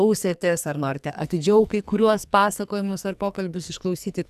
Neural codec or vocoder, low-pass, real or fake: codec, 44.1 kHz, 2.6 kbps, SNAC; 14.4 kHz; fake